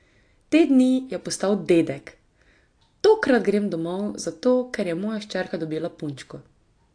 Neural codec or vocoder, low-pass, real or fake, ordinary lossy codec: none; 9.9 kHz; real; AAC, 48 kbps